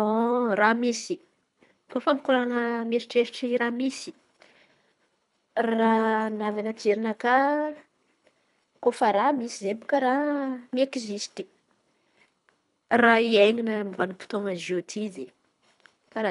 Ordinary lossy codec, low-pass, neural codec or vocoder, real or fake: none; 10.8 kHz; codec, 24 kHz, 3 kbps, HILCodec; fake